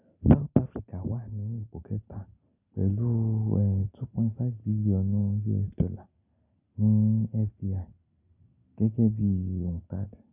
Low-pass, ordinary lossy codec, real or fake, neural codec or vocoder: 3.6 kHz; none; real; none